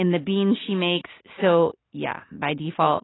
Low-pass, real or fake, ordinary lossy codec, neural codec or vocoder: 7.2 kHz; real; AAC, 16 kbps; none